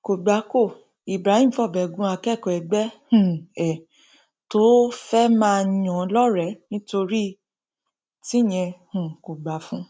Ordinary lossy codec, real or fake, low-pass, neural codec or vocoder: none; real; none; none